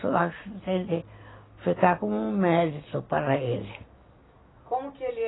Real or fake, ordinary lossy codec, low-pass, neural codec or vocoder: real; AAC, 16 kbps; 7.2 kHz; none